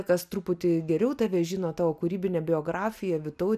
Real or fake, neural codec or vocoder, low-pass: real; none; 14.4 kHz